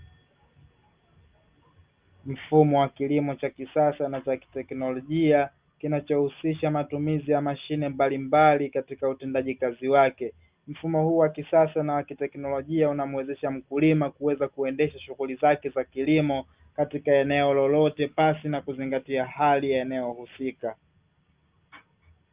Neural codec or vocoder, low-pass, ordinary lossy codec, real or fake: none; 3.6 kHz; Opus, 32 kbps; real